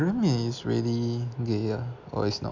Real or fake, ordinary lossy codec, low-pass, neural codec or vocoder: real; none; 7.2 kHz; none